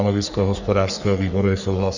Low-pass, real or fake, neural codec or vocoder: 7.2 kHz; fake; codec, 44.1 kHz, 3.4 kbps, Pupu-Codec